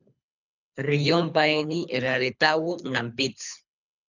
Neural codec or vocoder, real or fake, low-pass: codec, 24 kHz, 3 kbps, HILCodec; fake; 7.2 kHz